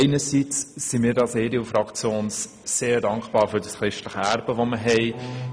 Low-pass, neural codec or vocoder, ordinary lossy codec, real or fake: none; none; none; real